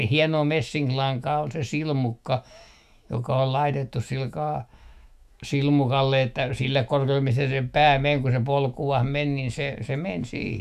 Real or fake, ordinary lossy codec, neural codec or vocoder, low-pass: fake; none; autoencoder, 48 kHz, 128 numbers a frame, DAC-VAE, trained on Japanese speech; 14.4 kHz